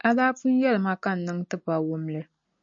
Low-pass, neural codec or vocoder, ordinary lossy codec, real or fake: 7.2 kHz; none; MP3, 48 kbps; real